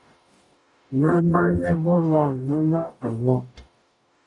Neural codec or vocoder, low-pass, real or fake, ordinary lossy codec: codec, 44.1 kHz, 0.9 kbps, DAC; 10.8 kHz; fake; AAC, 64 kbps